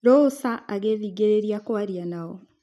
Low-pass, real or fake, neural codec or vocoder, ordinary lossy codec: 14.4 kHz; real; none; none